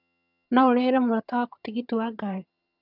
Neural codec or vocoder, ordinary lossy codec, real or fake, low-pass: vocoder, 22.05 kHz, 80 mel bands, HiFi-GAN; none; fake; 5.4 kHz